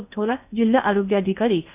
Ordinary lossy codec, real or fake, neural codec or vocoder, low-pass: none; fake; codec, 16 kHz in and 24 kHz out, 0.8 kbps, FocalCodec, streaming, 65536 codes; 3.6 kHz